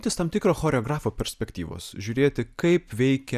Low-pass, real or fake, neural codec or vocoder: 14.4 kHz; real; none